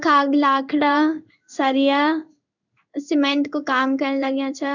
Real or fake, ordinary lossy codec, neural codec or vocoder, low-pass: fake; none; codec, 16 kHz in and 24 kHz out, 1 kbps, XY-Tokenizer; 7.2 kHz